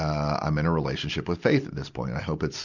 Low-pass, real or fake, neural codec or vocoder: 7.2 kHz; real; none